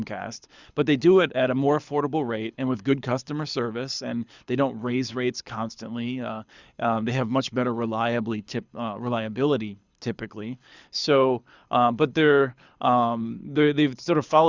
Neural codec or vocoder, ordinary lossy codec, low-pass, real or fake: codec, 24 kHz, 6 kbps, HILCodec; Opus, 64 kbps; 7.2 kHz; fake